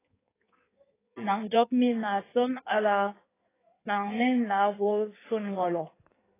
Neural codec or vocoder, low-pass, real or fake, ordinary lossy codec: codec, 16 kHz in and 24 kHz out, 1.1 kbps, FireRedTTS-2 codec; 3.6 kHz; fake; AAC, 16 kbps